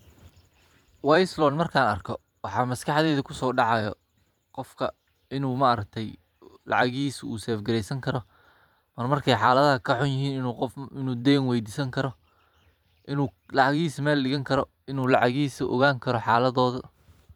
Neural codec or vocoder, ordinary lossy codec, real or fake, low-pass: vocoder, 44.1 kHz, 128 mel bands every 512 samples, BigVGAN v2; none; fake; 19.8 kHz